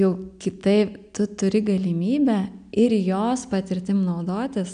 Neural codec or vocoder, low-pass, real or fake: none; 9.9 kHz; real